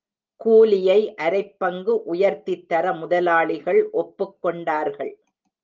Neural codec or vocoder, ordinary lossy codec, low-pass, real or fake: none; Opus, 24 kbps; 7.2 kHz; real